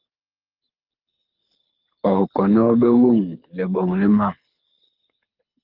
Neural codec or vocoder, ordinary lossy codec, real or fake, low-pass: codec, 24 kHz, 6 kbps, HILCodec; Opus, 24 kbps; fake; 5.4 kHz